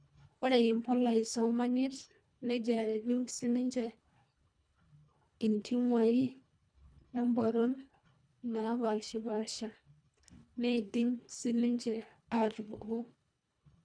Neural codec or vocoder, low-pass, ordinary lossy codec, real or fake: codec, 24 kHz, 1.5 kbps, HILCodec; 9.9 kHz; none; fake